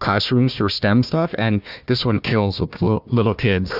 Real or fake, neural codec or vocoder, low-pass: fake; codec, 16 kHz, 1 kbps, FunCodec, trained on Chinese and English, 50 frames a second; 5.4 kHz